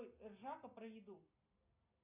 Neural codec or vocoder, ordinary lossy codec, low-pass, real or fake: none; AAC, 32 kbps; 3.6 kHz; real